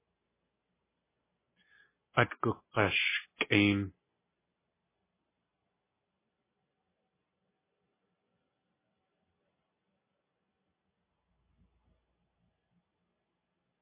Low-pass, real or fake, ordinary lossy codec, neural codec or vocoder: 3.6 kHz; real; MP3, 16 kbps; none